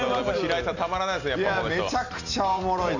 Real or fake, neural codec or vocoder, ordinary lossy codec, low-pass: real; none; none; 7.2 kHz